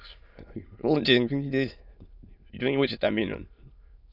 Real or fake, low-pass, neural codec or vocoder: fake; 5.4 kHz; autoencoder, 22.05 kHz, a latent of 192 numbers a frame, VITS, trained on many speakers